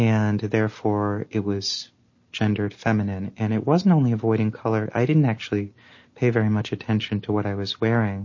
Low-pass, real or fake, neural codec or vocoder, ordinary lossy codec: 7.2 kHz; real; none; MP3, 32 kbps